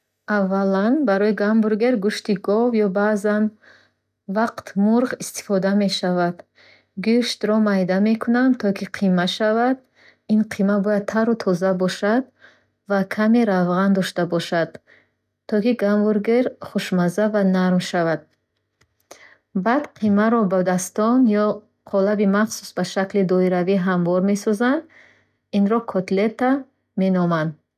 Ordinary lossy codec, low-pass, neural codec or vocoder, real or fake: none; 14.4 kHz; none; real